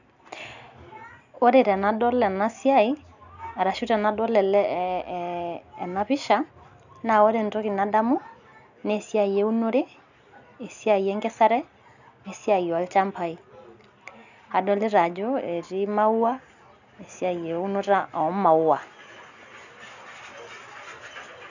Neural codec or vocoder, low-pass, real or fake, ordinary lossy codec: none; 7.2 kHz; real; none